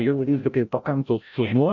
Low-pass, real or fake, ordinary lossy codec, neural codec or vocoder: 7.2 kHz; fake; MP3, 64 kbps; codec, 16 kHz, 0.5 kbps, FreqCodec, larger model